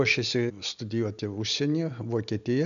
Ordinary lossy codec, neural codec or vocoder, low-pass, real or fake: MP3, 96 kbps; none; 7.2 kHz; real